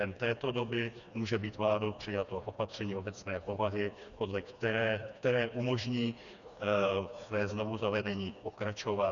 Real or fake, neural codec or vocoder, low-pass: fake; codec, 16 kHz, 2 kbps, FreqCodec, smaller model; 7.2 kHz